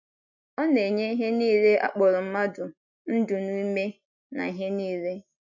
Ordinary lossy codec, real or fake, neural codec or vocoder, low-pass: none; real; none; 7.2 kHz